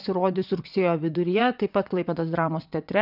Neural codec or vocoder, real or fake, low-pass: vocoder, 22.05 kHz, 80 mel bands, WaveNeXt; fake; 5.4 kHz